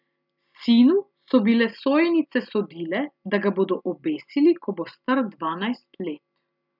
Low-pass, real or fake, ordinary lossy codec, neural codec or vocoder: 5.4 kHz; real; none; none